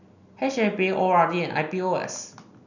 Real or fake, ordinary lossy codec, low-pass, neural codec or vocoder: real; none; 7.2 kHz; none